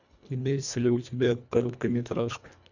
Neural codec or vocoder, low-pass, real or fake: codec, 24 kHz, 1.5 kbps, HILCodec; 7.2 kHz; fake